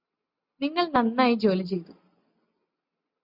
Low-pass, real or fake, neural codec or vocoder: 5.4 kHz; real; none